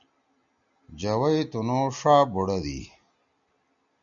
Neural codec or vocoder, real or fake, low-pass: none; real; 7.2 kHz